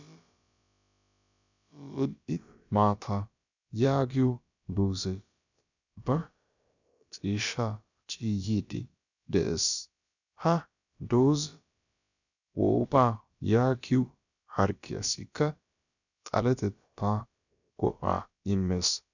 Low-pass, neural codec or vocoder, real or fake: 7.2 kHz; codec, 16 kHz, about 1 kbps, DyCAST, with the encoder's durations; fake